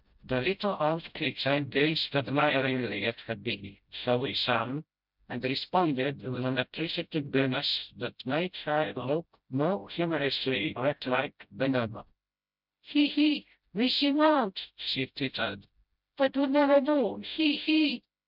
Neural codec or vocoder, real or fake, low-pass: codec, 16 kHz, 0.5 kbps, FreqCodec, smaller model; fake; 5.4 kHz